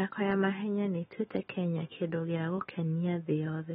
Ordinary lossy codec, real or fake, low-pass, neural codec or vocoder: AAC, 16 kbps; fake; 19.8 kHz; autoencoder, 48 kHz, 128 numbers a frame, DAC-VAE, trained on Japanese speech